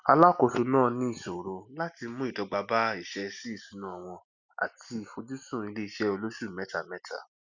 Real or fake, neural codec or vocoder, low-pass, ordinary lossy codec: real; none; 7.2 kHz; Opus, 64 kbps